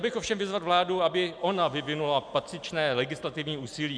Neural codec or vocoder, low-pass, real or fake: none; 9.9 kHz; real